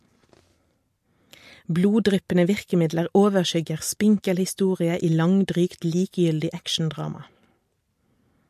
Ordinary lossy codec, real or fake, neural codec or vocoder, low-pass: MP3, 64 kbps; real; none; 14.4 kHz